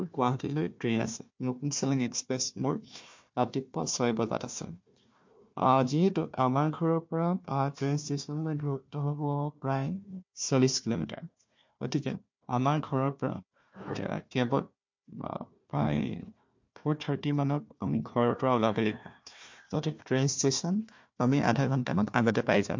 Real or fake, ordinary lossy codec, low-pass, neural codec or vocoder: fake; MP3, 48 kbps; 7.2 kHz; codec, 16 kHz, 1 kbps, FunCodec, trained on Chinese and English, 50 frames a second